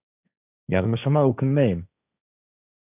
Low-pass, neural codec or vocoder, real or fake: 3.6 kHz; codec, 16 kHz, 1.1 kbps, Voila-Tokenizer; fake